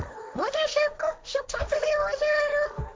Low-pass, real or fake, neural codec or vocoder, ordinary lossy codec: none; fake; codec, 16 kHz, 1.1 kbps, Voila-Tokenizer; none